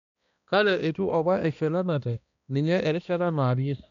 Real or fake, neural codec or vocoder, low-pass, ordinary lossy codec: fake; codec, 16 kHz, 1 kbps, X-Codec, HuBERT features, trained on balanced general audio; 7.2 kHz; none